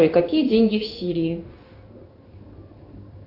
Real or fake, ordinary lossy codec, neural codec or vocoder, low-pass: fake; AAC, 32 kbps; codec, 16 kHz in and 24 kHz out, 1 kbps, XY-Tokenizer; 5.4 kHz